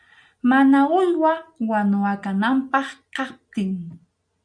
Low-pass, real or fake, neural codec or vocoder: 9.9 kHz; real; none